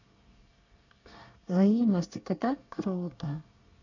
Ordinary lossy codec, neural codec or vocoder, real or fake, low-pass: none; codec, 24 kHz, 1 kbps, SNAC; fake; 7.2 kHz